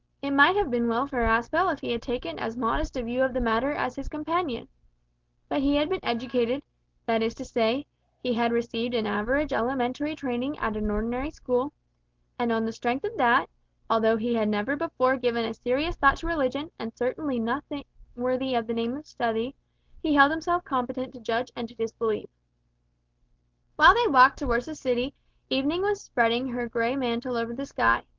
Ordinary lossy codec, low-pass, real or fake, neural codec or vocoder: Opus, 16 kbps; 7.2 kHz; real; none